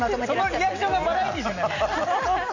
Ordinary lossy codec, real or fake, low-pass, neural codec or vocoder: none; real; 7.2 kHz; none